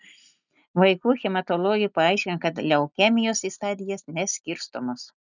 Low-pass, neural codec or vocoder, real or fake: 7.2 kHz; none; real